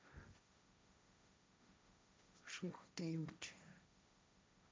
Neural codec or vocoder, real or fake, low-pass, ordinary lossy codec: codec, 16 kHz, 1.1 kbps, Voila-Tokenizer; fake; none; none